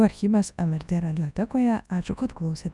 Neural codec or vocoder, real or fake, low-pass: codec, 24 kHz, 0.9 kbps, WavTokenizer, large speech release; fake; 10.8 kHz